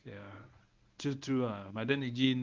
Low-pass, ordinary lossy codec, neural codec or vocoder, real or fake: 7.2 kHz; Opus, 24 kbps; codec, 24 kHz, 0.9 kbps, WavTokenizer, medium speech release version 1; fake